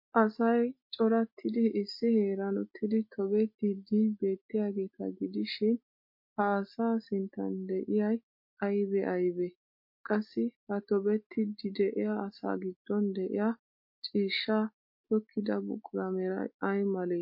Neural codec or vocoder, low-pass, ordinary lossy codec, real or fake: none; 5.4 kHz; MP3, 32 kbps; real